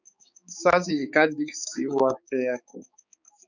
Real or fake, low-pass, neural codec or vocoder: fake; 7.2 kHz; codec, 16 kHz, 4 kbps, X-Codec, HuBERT features, trained on balanced general audio